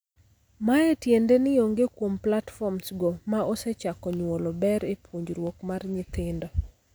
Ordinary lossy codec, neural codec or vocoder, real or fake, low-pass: none; none; real; none